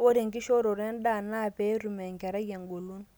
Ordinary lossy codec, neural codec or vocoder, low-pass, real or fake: none; none; none; real